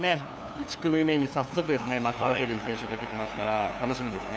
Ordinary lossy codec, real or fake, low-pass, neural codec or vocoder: none; fake; none; codec, 16 kHz, 2 kbps, FunCodec, trained on LibriTTS, 25 frames a second